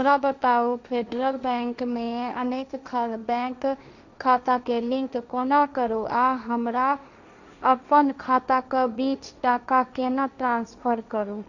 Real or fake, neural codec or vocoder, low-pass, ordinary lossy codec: fake; codec, 16 kHz, 1.1 kbps, Voila-Tokenizer; 7.2 kHz; none